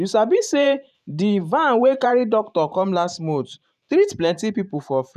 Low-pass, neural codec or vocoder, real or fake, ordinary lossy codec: 14.4 kHz; vocoder, 44.1 kHz, 128 mel bands every 512 samples, BigVGAN v2; fake; none